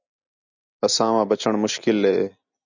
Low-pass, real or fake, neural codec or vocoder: 7.2 kHz; real; none